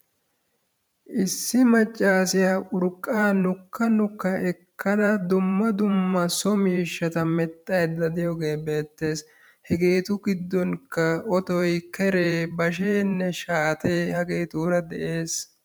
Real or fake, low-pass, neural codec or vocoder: fake; 19.8 kHz; vocoder, 44.1 kHz, 128 mel bands every 512 samples, BigVGAN v2